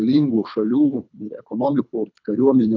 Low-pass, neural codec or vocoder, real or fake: 7.2 kHz; codec, 24 kHz, 3 kbps, HILCodec; fake